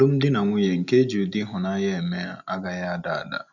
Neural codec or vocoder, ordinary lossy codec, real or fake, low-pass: none; none; real; 7.2 kHz